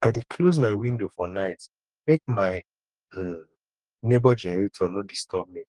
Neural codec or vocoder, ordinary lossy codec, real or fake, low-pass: codec, 44.1 kHz, 2.6 kbps, DAC; Opus, 32 kbps; fake; 10.8 kHz